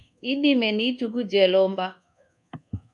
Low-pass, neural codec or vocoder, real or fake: 10.8 kHz; codec, 24 kHz, 1.2 kbps, DualCodec; fake